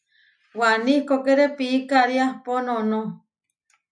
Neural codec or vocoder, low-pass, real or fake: none; 9.9 kHz; real